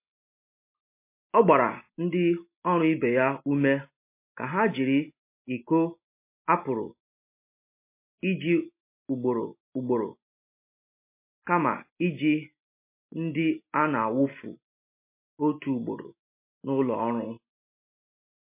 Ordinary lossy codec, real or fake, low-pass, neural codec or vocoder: MP3, 24 kbps; real; 3.6 kHz; none